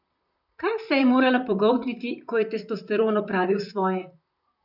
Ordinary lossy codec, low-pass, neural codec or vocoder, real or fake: none; 5.4 kHz; vocoder, 44.1 kHz, 128 mel bands, Pupu-Vocoder; fake